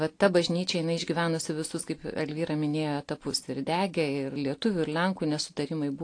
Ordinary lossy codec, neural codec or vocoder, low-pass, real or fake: AAC, 48 kbps; none; 9.9 kHz; real